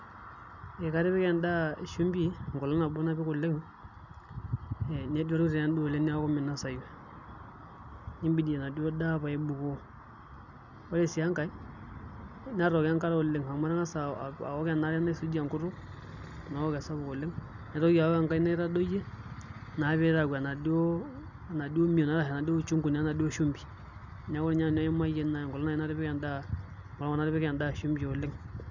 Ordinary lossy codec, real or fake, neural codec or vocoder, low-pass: none; real; none; 7.2 kHz